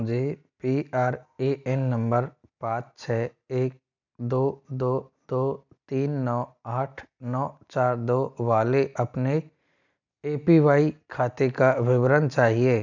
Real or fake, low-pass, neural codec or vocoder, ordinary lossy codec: real; 7.2 kHz; none; none